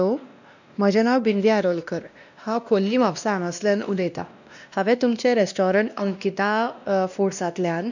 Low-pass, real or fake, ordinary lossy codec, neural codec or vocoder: 7.2 kHz; fake; none; codec, 16 kHz, 1 kbps, X-Codec, WavLM features, trained on Multilingual LibriSpeech